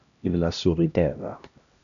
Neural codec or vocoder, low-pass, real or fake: codec, 16 kHz, 1 kbps, X-Codec, HuBERT features, trained on LibriSpeech; 7.2 kHz; fake